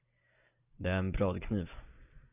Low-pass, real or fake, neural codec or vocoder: 3.6 kHz; real; none